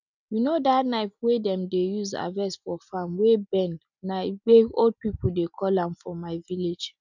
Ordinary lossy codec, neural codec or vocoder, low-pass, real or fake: none; none; 7.2 kHz; real